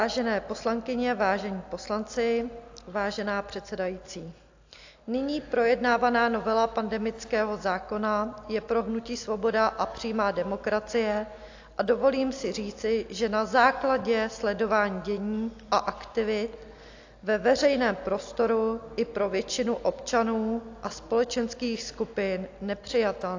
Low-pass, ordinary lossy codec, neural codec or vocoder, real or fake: 7.2 kHz; AAC, 48 kbps; none; real